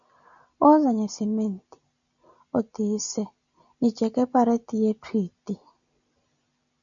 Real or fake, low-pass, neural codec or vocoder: real; 7.2 kHz; none